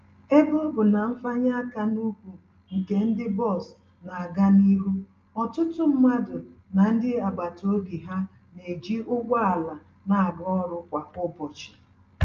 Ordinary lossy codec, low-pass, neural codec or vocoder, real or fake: Opus, 24 kbps; 7.2 kHz; none; real